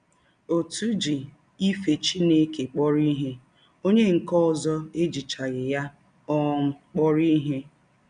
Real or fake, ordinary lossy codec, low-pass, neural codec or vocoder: real; none; 9.9 kHz; none